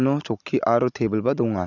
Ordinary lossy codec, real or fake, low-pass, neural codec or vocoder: none; real; 7.2 kHz; none